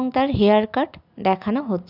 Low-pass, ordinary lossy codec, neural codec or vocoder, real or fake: 5.4 kHz; none; none; real